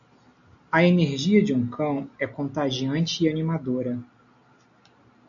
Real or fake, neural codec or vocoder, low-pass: real; none; 7.2 kHz